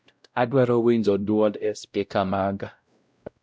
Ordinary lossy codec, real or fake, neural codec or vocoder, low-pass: none; fake; codec, 16 kHz, 0.5 kbps, X-Codec, WavLM features, trained on Multilingual LibriSpeech; none